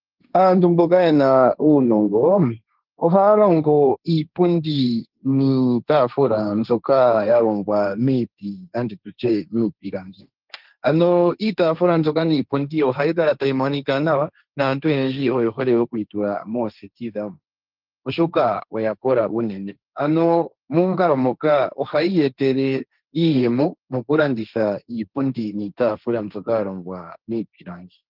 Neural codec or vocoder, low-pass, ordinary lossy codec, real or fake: codec, 16 kHz, 1.1 kbps, Voila-Tokenizer; 5.4 kHz; Opus, 32 kbps; fake